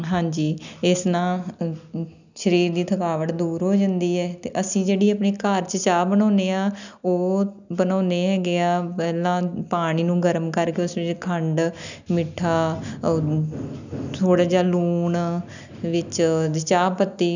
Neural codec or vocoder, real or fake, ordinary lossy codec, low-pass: none; real; none; 7.2 kHz